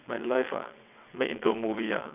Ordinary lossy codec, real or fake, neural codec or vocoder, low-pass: none; fake; vocoder, 22.05 kHz, 80 mel bands, WaveNeXt; 3.6 kHz